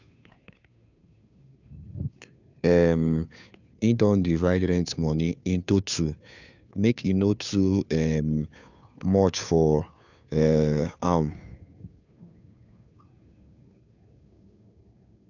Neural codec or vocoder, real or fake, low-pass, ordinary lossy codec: codec, 16 kHz, 2 kbps, FunCodec, trained on Chinese and English, 25 frames a second; fake; 7.2 kHz; none